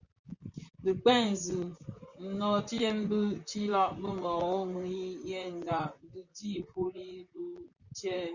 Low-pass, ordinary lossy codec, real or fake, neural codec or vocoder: 7.2 kHz; Opus, 64 kbps; fake; vocoder, 44.1 kHz, 128 mel bands, Pupu-Vocoder